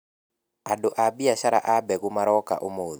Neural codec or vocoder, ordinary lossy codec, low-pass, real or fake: none; none; none; real